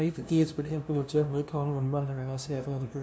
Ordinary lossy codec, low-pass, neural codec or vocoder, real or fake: none; none; codec, 16 kHz, 0.5 kbps, FunCodec, trained on LibriTTS, 25 frames a second; fake